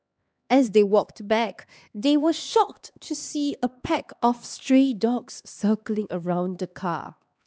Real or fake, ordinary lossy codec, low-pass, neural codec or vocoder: fake; none; none; codec, 16 kHz, 2 kbps, X-Codec, HuBERT features, trained on LibriSpeech